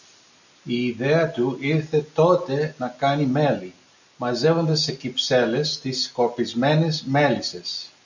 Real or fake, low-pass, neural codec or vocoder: real; 7.2 kHz; none